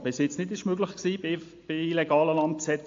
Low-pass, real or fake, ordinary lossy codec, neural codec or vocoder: 7.2 kHz; real; AAC, 48 kbps; none